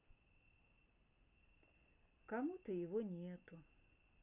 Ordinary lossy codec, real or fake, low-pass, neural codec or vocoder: none; real; 3.6 kHz; none